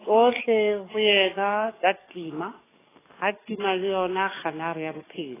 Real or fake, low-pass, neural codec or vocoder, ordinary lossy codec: fake; 3.6 kHz; codec, 44.1 kHz, 7.8 kbps, DAC; AAC, 16 kbps